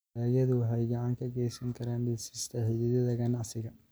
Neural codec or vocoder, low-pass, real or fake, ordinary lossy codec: none; none; real; none